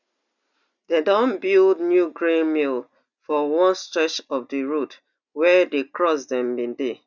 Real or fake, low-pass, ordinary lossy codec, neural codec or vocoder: real; 7.2 kHz; none; none